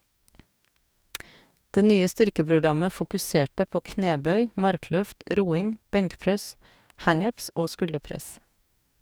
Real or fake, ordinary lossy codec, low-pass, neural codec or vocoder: fake; none; none; codec, 44.1 kHz, 2.6 kbps, DAC